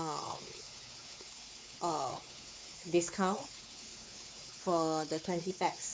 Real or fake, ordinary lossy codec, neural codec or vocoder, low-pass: fake; none; codec, 16 kHz, 4 kbps, X-Codec, WavLM features, trained on Multilingual LibriSpeech; none